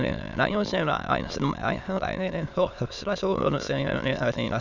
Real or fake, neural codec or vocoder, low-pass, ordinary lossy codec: fake; autoencoder, 22.05 kHz, a latent of 192 numbers a frame, VITS, trained on many speakers; 7.2 kHz; none